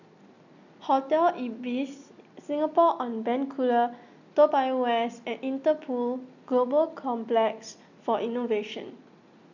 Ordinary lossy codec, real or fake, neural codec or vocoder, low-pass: none; real; none; 7.2 kHz